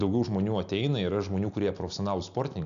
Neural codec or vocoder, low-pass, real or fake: none; 7.2 kHz; real